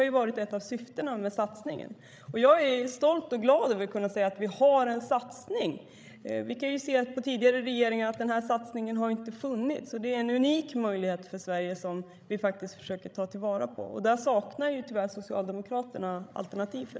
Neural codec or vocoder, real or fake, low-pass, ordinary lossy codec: codec, 16 kHz, 16 kbps, FreqCodec, larger model; fake; none; none